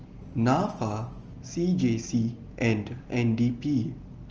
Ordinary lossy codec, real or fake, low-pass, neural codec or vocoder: Opus, 24 kbps; real; 7.2 kHz; none